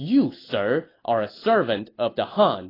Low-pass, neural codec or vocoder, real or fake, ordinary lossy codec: 5.4 kHz; none; real; AAC, 24 kbps